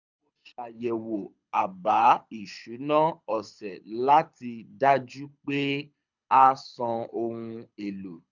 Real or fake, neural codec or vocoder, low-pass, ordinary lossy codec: fake; codec, 24 kHz, 6 kbps, HILCodec; 7.2 kHz; none